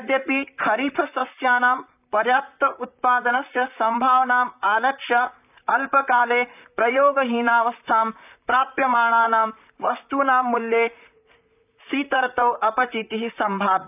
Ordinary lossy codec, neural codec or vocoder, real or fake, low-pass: none; vocoder, 44.1 kHz, 128 mel bands, Pupu-Vocoder; fake; 3.6 kHz